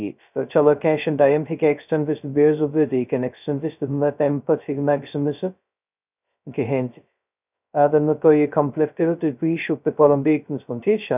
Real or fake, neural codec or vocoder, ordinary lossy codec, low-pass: fake; codec, 16 kHz, 0.2 kbps, FocalCodec; none; 3.6 kHz